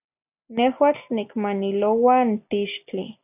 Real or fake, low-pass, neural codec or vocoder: real; 3.6 kHz; none